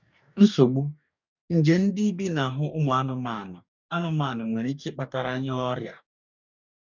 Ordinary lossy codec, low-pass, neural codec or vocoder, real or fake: none; 7.2 kHz; codec, 44.1 kHz, 2.6 kbps, DAC; fake